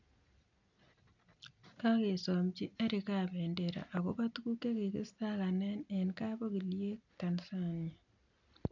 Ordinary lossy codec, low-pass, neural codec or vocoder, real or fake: none; 7.2 kHz; none; real